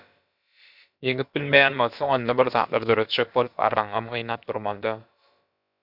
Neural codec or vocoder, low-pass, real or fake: codec, 16 kHz, about 1 kbps, DyCAST, with the encoder's durations; 5.4 kHz; fake